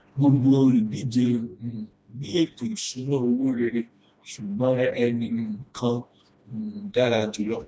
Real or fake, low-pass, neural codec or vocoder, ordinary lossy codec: fake; none; codec, 16 kHz, 1 kbps, FreqCodec, smaller model; none